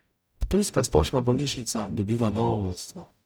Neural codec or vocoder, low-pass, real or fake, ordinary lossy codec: codec, 44.1 kHz, 0.9 kbps, DAC; none; fake; none